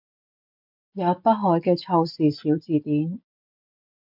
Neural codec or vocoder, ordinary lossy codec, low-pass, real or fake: none; MP3, 48 kbps; 5.4 kHz; real